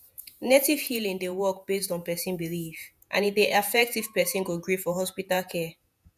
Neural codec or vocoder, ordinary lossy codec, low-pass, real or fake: vocoder, 48 kHz, 128 mel bands, Vocos; none; 14.4 kHz; fake